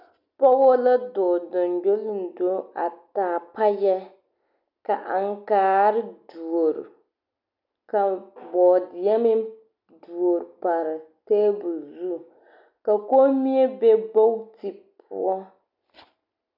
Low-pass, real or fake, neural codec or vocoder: 5.4 kHz; real; none